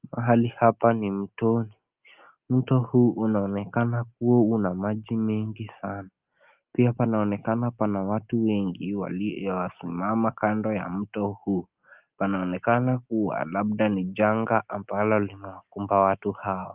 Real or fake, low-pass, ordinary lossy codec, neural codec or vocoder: real; 3.6 kHz; Opus, 32 kbps; none